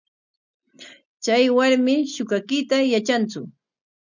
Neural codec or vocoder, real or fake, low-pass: none; real; 7.2 kHz